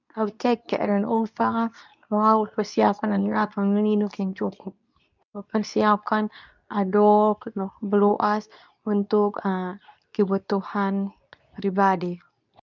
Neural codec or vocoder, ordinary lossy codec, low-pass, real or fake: codec, 24 kHz, 0.9 kbps, WavTokenizer, medium speech release version 2; none; 7.2 kHz; fake